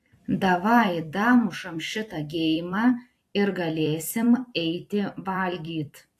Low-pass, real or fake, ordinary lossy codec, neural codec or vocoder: 14.4 kHz; fake; AAC, 64 kbps; vocoder, 44.1 kHz, 128 mel bands every 256 samples, BigVGAN v2